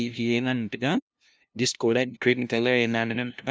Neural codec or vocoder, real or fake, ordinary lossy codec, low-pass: codec, 16 kHz, 0.5 kbps, FunCodec, trained on LibriTTS, 25 frames a second; fake; none; none